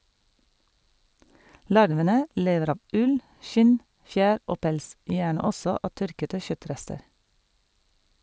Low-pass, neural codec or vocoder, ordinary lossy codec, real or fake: none; none; none; real